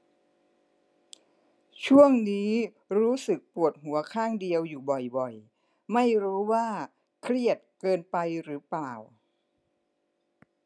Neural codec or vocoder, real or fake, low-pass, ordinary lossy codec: none; real; none; none